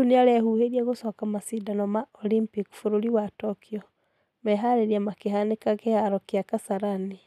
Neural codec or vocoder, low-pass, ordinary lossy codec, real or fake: none; 14.4 kHz; none; real